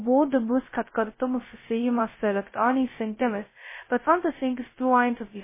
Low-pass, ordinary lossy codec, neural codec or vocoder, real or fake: 3.6 kHz; MP3, 16 kbps; codec, 16 kHz, 0.2 kbps, FocalCodec; fake